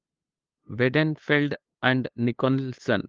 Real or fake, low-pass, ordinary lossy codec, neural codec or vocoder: fake; 7.2 kHz; Opus, 32 kbps; codec, 16 kHz, 2 kbps, FunCodec, trained on LibriTTS, 25 frames a second